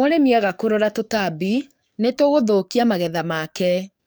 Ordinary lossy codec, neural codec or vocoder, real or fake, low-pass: none; codec, 44.1 kHz, 7.8 kbps, DAC; fake; none